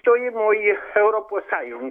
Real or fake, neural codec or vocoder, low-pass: fake; autoencoder, 48 kHz, 128 numbers a frame, DAC-VAE, trained on Japanese speech; 19.8 kHz